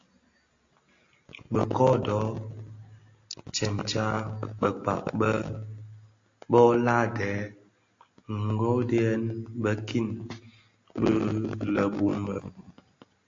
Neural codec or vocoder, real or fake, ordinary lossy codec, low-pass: none; real; AAC, 48 kbps; 7.2 kHz